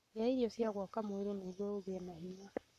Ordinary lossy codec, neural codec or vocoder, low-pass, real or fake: none; codec, 44.1 kHz, 7.8 kbps, Pupu-Codec; 14.4 kHz; fake